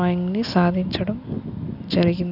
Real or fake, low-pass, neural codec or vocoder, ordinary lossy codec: real; 5.4 kHz; none; none